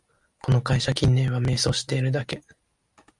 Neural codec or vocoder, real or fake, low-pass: none; real; 10.8 kHz